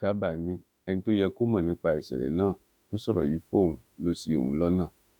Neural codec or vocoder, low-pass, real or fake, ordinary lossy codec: autoencoder, 48 kHz, 32 numbers a frame, DAC-VAE, trained on Japanese speech; 19.8 kHz; fake; none